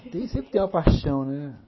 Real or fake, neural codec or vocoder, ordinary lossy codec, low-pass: real; none; MP3, 24 kbps; 7.2 kHz